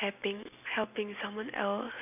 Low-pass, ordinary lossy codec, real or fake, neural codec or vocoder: 3.6 kHz; none; real; none